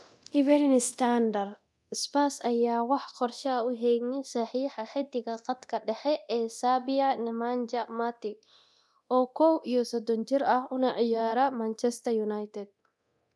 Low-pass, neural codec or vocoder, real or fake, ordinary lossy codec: none; codec, 24 kHz, 0.9 kbps, DualCodec; fake; none